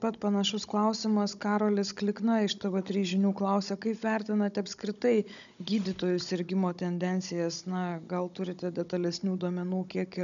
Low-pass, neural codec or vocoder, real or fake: 7.2 kHz; codec, 16 kHz, 16 kbps, FunCodec, trained on Chinese and English, 50 frames a second; fake